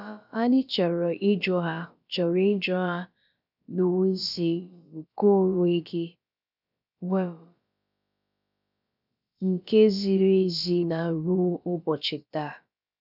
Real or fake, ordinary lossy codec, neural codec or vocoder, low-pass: fake; none; codec, 16 kHz, about 1 kbps, DyCAST, with the encoder's durations; 5.4 kHz